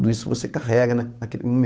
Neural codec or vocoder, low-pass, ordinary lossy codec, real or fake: codec, 16 kHz, 8 kbps, FunCodec, trained on Chinese and English, 25 frames a second; none; none; fake